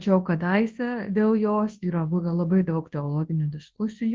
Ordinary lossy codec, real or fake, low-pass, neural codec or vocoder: Opus, 16 kbps; fake; 7.2 kHz; codec, 24 kHz, 0.9 kbps, WavTokenizer, large speech release